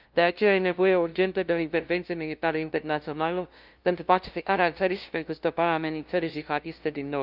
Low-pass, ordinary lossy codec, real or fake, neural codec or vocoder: 5.4 kHz; Opus, 24 kbps; fake; codec, 16 kHz, 0.5 kbps, FunCodec, trained on LibriTTS, 25 frames a second